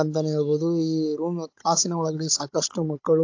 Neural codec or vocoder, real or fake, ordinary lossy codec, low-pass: codec, 16 kHz, 16 kbps, FunCodec, trained on Chinese and English, 50 frames a second; fake; AAC, 48 kbps; 7.2 kHz